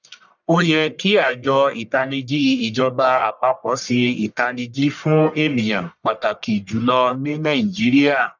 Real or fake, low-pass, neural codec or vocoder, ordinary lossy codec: fake; 7.2 kHz; codec, 44.1 kHz, 1.7 kbps, Pupu-Codec; none